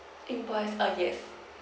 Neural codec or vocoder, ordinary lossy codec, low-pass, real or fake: none; none; none; real